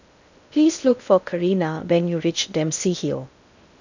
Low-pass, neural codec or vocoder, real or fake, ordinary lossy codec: 7.2 kHz; codec, 16 kHz in and 24 kHz out, 0.6 kbps, FocalCodec, streaming, 2048 codes; fake; none